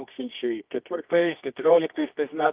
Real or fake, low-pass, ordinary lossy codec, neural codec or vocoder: fake; 3.6 kHz; Opus, 32 kbps; codec, 24 kHz, 0.9 kbps, WavTokenizer, medium music audio release